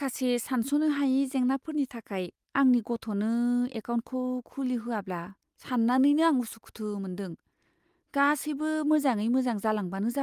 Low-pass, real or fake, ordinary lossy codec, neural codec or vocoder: 14.4 kHz; real; Opus, 32 kbps; none